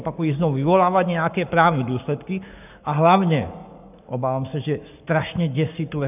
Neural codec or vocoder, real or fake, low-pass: codec, 44.1 kHz, 7.8 kbps, Pupu-Codec; fake; 3.6 kHz